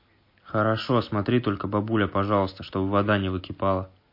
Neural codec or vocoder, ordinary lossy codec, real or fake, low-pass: none; MP3, 32 kbps; real; 5.4 kHz